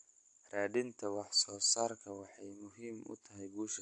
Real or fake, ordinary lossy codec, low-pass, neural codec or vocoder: real; AAC, 64 kbps; 10.8 kHz; none